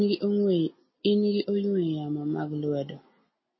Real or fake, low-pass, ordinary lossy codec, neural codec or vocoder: real; 7.2 kHz; MP3, 24 kbps; none